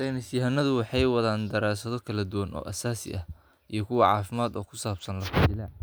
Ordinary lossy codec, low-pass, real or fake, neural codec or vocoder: none; none; real; none